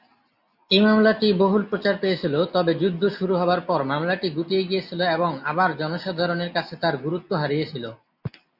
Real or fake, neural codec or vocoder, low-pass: real; none; 5.4 kHz